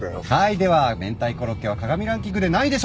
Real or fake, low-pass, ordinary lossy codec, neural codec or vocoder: real; none; none; none